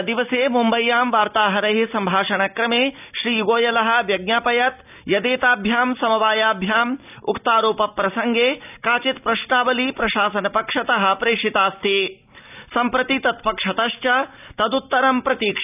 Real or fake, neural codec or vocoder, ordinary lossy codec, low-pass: real; none; none; 3.6 kHz